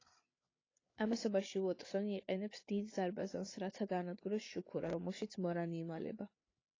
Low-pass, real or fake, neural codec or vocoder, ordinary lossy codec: 7.2 kHz; fake; codec, 16 kHz, 8 kbps, FreqCodec, larger model; AAC, 32 kbps